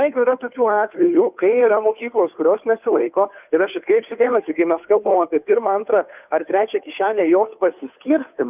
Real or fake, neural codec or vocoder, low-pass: fake; codec, 16 kHz, 2 kbps, FunCodec, trained on Chinese and English, 25 frames a second; 3.6 kHz